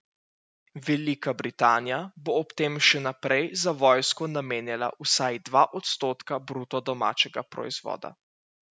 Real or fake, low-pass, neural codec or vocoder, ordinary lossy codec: real; none; none; none